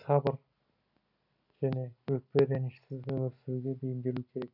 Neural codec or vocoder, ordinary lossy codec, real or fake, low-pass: none; none; real; 5.4 kHz